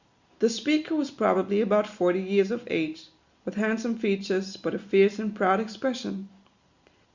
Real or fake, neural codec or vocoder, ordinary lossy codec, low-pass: real; none; Opus, 64 kbps; 7.2 kHz